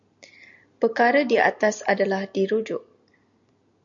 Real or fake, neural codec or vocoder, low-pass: real; none; 7.2 kHz